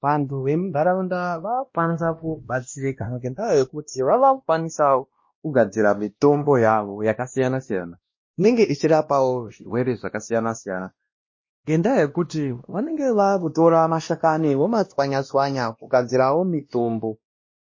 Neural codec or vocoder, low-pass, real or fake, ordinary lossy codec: codec, 16 kHz, 1 kbps, X-Codec, WavLM features, trained on Multilingual LibriSpeech; 7.2 kHz; fake; MP3, 32 kbps